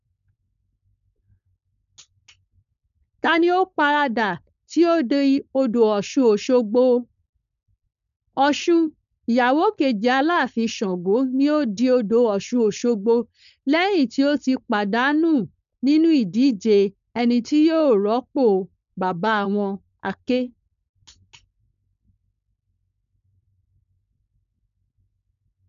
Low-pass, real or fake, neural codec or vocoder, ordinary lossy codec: 7.2 kHz; fake; codec, 16 kHz, 4.8 kbps, FACodec; none